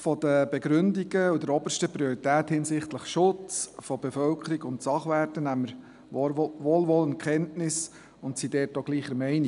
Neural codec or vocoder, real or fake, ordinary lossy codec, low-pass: none; real; none; 10.8 kHz